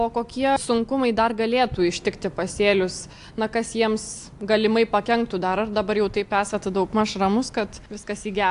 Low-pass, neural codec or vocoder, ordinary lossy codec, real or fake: 10.8 kHz; none; AAC, 64 kbps; real